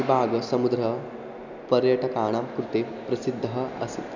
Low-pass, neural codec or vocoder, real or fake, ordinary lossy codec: 7.2 kHz; none; real; none